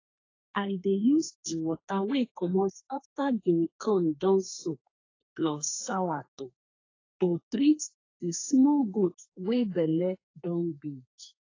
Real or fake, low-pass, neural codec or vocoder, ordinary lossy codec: fake; 7.2 kHz; codec, 32 kHz, 1.9 kbps, SNAC; AAC, 32 kbps